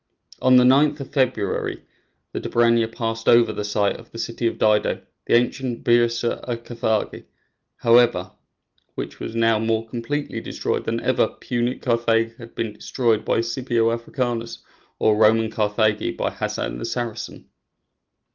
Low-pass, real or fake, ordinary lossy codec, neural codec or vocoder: 7.2 kHz; real; Opus, 32 kbps; none